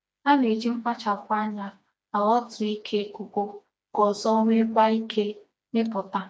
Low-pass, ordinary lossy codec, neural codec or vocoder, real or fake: none; none; codec, 16 kHz, 2 kbps, FreqCodec, smaller model; fake